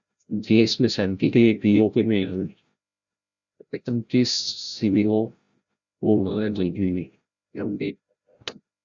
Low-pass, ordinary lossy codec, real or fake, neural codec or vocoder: 7.2 kHz; Opus, 64 kbps; fake; codec, 16 kHz, 0.5 kbps, FreqCodec, larger model